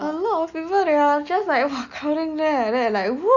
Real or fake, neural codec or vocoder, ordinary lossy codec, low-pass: real; none; none; 7.2 kHz